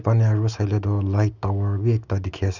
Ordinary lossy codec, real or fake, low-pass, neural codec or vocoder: none; real; 7.2 kHz; none